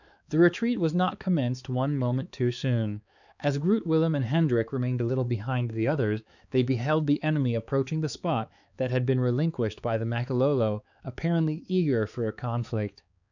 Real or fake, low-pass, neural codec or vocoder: fake; 7.2 kHz; codec, 16 kHz, 4 kbps, X-Codec, HuBERT features, trained on balanced general audio